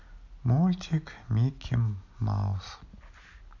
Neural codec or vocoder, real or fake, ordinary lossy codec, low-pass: none; real; none; 7.2 kHz